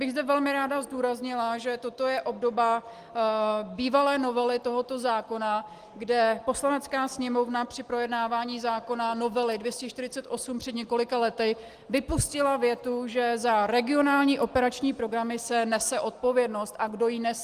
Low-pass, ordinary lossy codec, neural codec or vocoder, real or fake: 14.4 kHz; Opus, 24 kbps; none; real